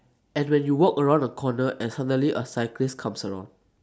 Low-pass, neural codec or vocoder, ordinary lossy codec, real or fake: none; none; none; real